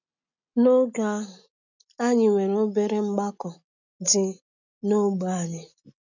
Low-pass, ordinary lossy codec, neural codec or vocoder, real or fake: 7.2 kHz; none; none; real